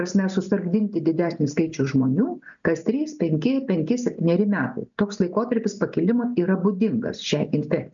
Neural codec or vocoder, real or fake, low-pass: none; real; 7.2 kHz